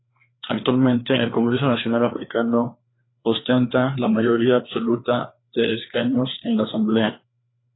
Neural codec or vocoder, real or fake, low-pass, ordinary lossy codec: codec, 16 kHz, 2 kbps, FreqCodec, larger model; fake; 7.2 kHz; AAC, 16 kbps